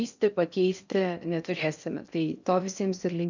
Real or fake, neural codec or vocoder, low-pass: fake; codec, 16 kHz in and 24 kHz out, 0.6 kbps, FocalCodec, streaming, 4096 codes; 7.2 kHz